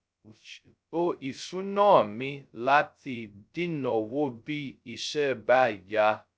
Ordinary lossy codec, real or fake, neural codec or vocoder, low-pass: none; fake; codec, 16 kHz, 0.2 kbps, FocalCodec; none